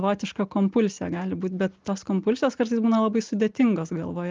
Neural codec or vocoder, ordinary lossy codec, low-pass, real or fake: none; Opus, 32 kbps; 7.2 kHz; real